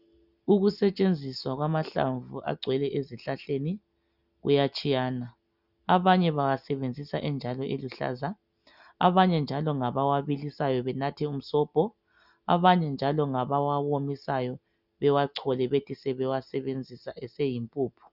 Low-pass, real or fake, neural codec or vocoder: 5.4 kHz; real; none